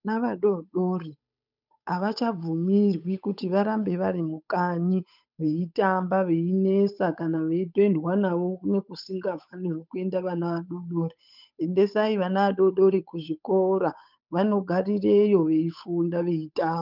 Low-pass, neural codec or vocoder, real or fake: 5.4 kHz; codec, 16 kHz, 8 kbps, FunCodec, trained on Chinese and English, 25 frames a second; fake